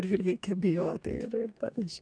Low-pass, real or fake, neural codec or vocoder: 9.9 kHz; fake; codec, 44.1 kHz, 2.6 kbps, DAC